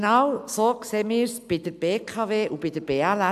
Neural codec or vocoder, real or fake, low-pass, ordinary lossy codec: none; real; 14.4 kHz; AAC, 96 kbps